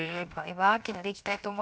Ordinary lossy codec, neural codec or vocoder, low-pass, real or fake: none; codec, 16 kHz, 0.7 kbps, FocalCodec; none; fake